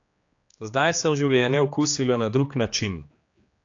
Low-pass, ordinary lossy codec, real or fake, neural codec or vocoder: 7.2 kHz; AAC, 48 kbps; fake; codec, 16 kHz, 2 kbps, X-Codec, HuBERT features, trained on general audio